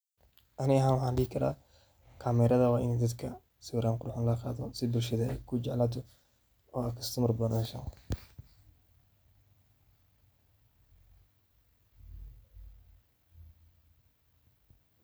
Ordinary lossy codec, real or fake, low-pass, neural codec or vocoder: none; real; none; none